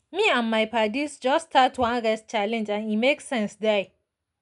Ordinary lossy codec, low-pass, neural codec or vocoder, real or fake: none; 10.8 kHz; none; real